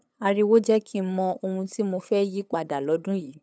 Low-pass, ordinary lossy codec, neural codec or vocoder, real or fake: none; none; codec, 16 kHz, 8 kbps, FunCodec, trained on LibriTTS, 25 frames a second; fake